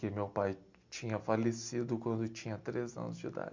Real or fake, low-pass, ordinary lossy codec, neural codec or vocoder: real; 7.2 kHz; MP3, 64 kbps; none